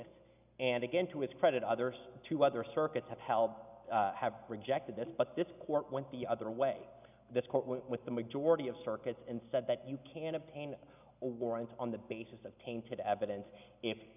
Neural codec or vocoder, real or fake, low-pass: none; real; 3.6 kHz